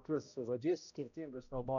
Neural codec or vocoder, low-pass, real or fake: codec, 16 kHz, 0.5 kbps, X-Codec, HuBERT features, trained on balanced general audio; 7.2 kHz; fake